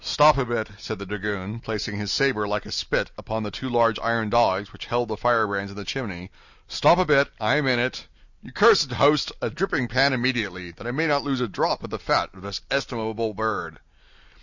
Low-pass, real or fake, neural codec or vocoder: 7.2 kHz; real; none